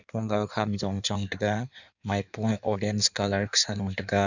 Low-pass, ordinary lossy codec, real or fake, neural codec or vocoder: 7.2 kHz; none; fake; codec, 16 kHz in and 24 kHz out, 1.1 kbps, FireRedTTS-2 codec